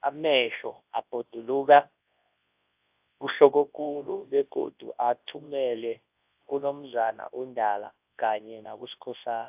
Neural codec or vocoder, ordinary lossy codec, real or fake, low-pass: codec, 24 kHz, 0.9 kbps, WavTokenizer, large speech release; AAC, 32 kbps; fake; 3.6 kHz